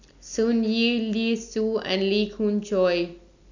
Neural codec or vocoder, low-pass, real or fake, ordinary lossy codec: none; 7.2 kHz; real; none